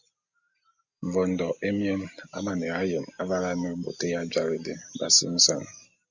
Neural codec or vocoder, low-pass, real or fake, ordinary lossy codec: none; 7.2 kHz; real; Opus, 64 kbps